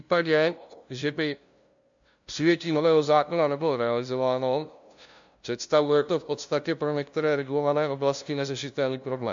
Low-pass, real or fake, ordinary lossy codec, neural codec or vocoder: 7.2 kHz; fake; MP3, 64 kbps; codec, 16 kHz, 0.5 kbps, FunCodec, trained on LibriTTS, 25 frames a second